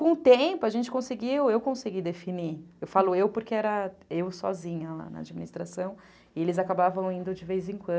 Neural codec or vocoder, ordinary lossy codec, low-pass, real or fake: none; none; none; real